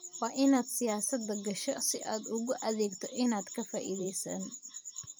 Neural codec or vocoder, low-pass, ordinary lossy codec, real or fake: vocoder, 44.1 kHz, 128 mel bands every 512 samples, BigVGAN v2; none; none; fake